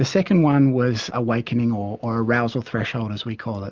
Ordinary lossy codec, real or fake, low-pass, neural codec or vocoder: Opus, 32 kbps; real; 7.2 kHz; none